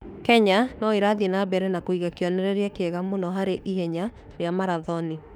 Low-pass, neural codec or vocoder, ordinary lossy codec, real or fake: 19.8 kHz; autoencoder, 48 kHz, 32 numbers a frame, DAC-VAE, trained on Japanese speech; none; fake